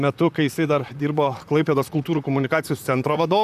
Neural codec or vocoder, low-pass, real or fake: vocoder, 44.1 kHz, 128 mel bands, Pupu-Vocoder; 14.4 kHz; fake